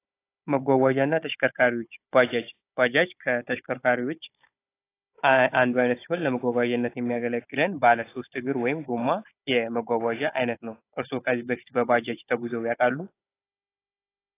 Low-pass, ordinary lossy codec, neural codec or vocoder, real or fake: 3.6 kHz; AAC, 24 kbps; codec, 16 kHz, 16 kbps, FunCodec, trained on Chinese and English, 50 frames a second; fake